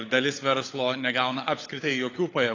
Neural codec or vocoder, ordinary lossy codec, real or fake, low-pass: codec, 16 kHz, 16 kbps, FunCodec, trained on LibriTTS, 50 frames a second; AAC, 32 kbps; fake; 7.2 kHz